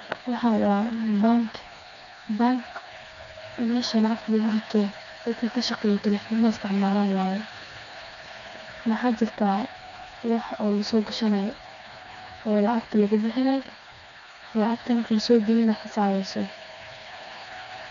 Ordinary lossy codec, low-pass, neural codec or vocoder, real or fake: none; 7.2 kHz; codec, 16 kHz, 2 kbps, FreqCodec, smaller model; fake